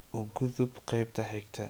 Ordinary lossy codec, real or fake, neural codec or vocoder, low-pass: none; fake; codec, 44.1 kHz, 7.8 kbps, DAC; none